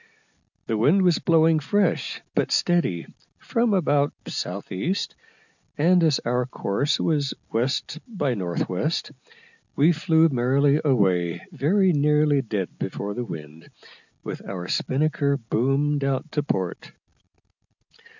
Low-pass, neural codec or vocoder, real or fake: 7.2 kHz; none; real